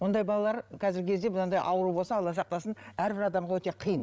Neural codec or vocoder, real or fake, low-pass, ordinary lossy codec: codec, 16 kHz, 16 kbps, FreqCodec, larger model; fake; none; none